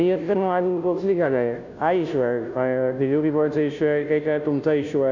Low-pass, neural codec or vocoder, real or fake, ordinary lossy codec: 7.2 kHz; codec, 16 kHz, 0.5 kbps, FunCodec, trained on Chinese and English, 25 frames a second; fake; none